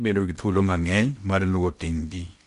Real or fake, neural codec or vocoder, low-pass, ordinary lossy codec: fake; codec, 16 kHz in and 24 kHz out, 0.8 kbps, FocalCodec, streaming, 65536 codes; 10.8 kHz; none